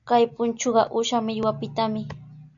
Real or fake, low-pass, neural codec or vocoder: real; 7.2 kHz; none